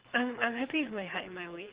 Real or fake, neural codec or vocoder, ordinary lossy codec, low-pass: fake; codec, 24 kHz, 6 kbps, HILCodec; Opus, 16 kbps; 3.6 kHz